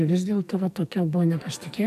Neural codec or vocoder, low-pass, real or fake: codec, 44.1 kHz, 2.6 kbps, SNAC; 14.4 kHz; fake